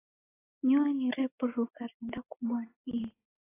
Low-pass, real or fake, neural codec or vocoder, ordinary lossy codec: 3.6 kHz; real; none; AAC, 16 kbps